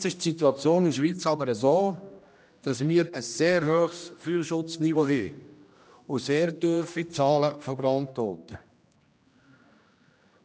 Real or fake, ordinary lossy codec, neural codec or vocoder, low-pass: fake; none; codec, 16 kHz, 1 kbps, X-Codec, HuBERT features, trained on general audio; none